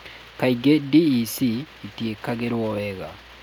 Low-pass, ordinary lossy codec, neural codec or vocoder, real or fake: 19.8 kHz; none; none; real